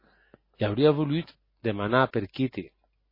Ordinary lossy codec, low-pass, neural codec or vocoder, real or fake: MP3, 24 kbps; 5.4 kHz; none; real